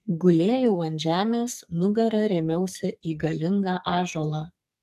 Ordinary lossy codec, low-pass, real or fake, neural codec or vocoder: AAC, 96 kbps; 14.4 kHz; fake; codec, 44.1 kHz, 2.6 kbps, SNAC